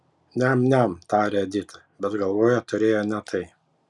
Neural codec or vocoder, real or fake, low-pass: none; real; 10.8 kHz